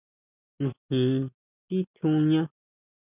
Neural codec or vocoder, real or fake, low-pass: none; real; 3.6 kHz